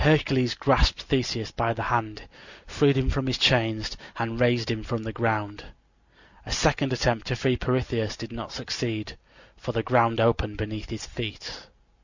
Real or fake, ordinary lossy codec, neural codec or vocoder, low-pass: real; Opus, 64 kbps; none; 7.2 kHz